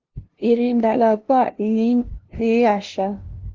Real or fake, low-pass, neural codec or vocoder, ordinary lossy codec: fake; 7.2 kHz; codec, 16 kHz, 0.5 kbps, FunCodec, trained on LibriTTS, 25 frames a second; Opus, 16 kbps